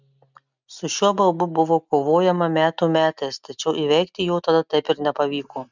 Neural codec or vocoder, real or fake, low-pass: none; real; 7.2 kHz